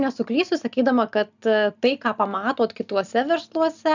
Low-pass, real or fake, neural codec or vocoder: 7.2 kHz; real; none